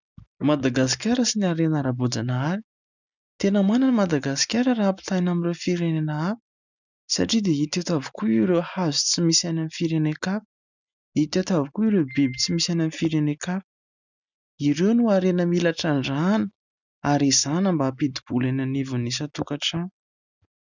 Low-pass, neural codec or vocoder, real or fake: 7.2 kHz; none; real